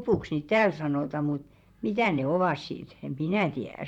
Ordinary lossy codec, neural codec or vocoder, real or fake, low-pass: none; vocoder, 44.1 kHz, 128 mel bands, Pupu-Vocoder; fake; 19.8 kHz